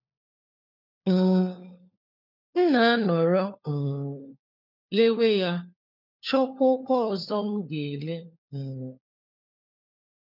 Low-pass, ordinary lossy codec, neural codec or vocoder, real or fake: 5.4 kHz; none; codec, 16 kHz, 4 kbps, FunCodec, trained on LibriTTS, 50 frames a second; fake